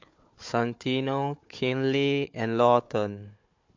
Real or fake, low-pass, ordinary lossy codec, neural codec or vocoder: fake; 7.2 kHz; MP3, 48 kbps; codec, 16 kHz, 4 kbps, FunCodec, trained on Chinese and English, 50 frames a second